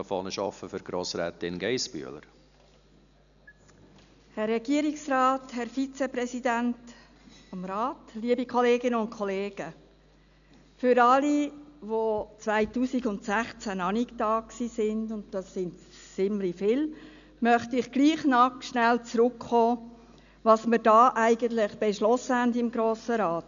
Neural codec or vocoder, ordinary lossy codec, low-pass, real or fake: none; none; 7.2 kHz; real